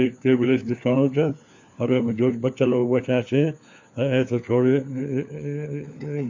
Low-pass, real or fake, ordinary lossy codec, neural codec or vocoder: 7.2 kHz; fake; MP3, 48 kbps; codec, 16 kHz, 4 kbps, FunCodec, trained on LibriTTS, 50 frames a second